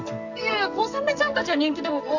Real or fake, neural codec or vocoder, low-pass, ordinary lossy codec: fake; codec, 44.1 kHz, 2.6 kbps, SNAC; 7.2 kHz; none